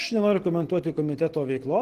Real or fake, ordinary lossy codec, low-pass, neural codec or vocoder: real; Opus, 16 kbps; 14.4 kHz; none